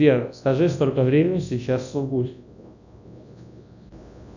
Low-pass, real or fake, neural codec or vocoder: 7.2 kHz; fake; codec, 24 kHz, 0.9 kbps, WavTokenizer, large speech release